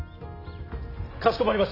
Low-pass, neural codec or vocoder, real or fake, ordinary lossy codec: 5.4 kHz; none; real; AAC, 24 kbps